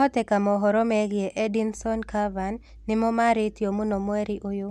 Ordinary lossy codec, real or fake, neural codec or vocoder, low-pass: none; real; none; 14.4 kHz